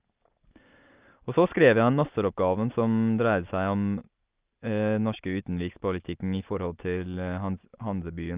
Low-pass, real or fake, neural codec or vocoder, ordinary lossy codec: 3.6 kHz; real; none; Opus, 32 kbps